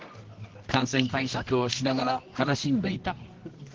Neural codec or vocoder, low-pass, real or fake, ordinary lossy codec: codec, 24 kHz, 0.9 kbps, WavTokenizer, medium music audio release; 7.2 kHz; fake; Opus, 16 kbps